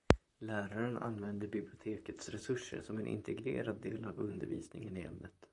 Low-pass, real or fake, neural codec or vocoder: 10.8 kHz; fake; vocoder, 44.1 kHz, 128 mel bands, Pupu-Vocoder